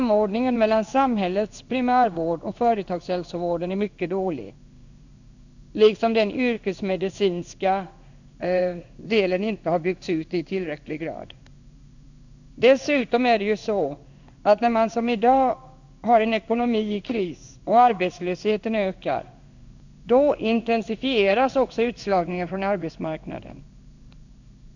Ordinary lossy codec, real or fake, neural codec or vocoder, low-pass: none; fake; codec, 16 kHz in and 24 kHz out, 1 kbps, XY-Tokenizer; 7.2 kHz